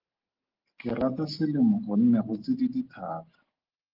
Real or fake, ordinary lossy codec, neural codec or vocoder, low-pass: real; Opus, 16 kbps; none; 5.4 kHz